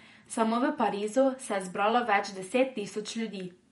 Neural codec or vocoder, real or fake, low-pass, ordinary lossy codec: vocoder, 48 kHz, 128 mel bands, Vocos; fake; 19.8 kHz; MP3, 48 kbps